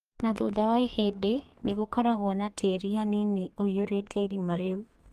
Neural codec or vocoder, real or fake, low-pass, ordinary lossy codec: codec, 32 kHz, 1.9 kbps, SNAC; fake; 14.4 kHz; Opus, 32 kbps